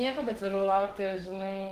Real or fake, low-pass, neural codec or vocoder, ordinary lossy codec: fake; 14.4 kHz; autoencoder, 48 kHz, 32 numbers a frame, DAC-VAE, trained on Japanese speech; Opus, 16 kbps